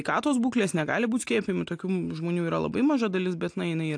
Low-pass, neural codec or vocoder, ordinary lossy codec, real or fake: 9.9 kHz; none; AAC, 64 kbps; real